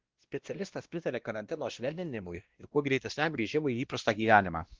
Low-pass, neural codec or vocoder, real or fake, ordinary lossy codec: 7.2 kHz; codec, 16 kHz, 1 kbps, X-Codec, WavLM features, trained on Multilingual LibriSpeech; fake; Opus, 16 kbps